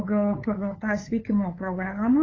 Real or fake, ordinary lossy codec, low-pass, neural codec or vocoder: fake; AAC, 32 kbps; 7.2 kHz; codec, 16 kHz, 8 kbps, FunCodec, trained on LibriTTS, 25 frames a second